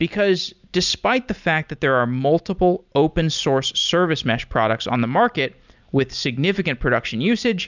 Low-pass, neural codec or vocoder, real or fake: 7.2 kHz; none; real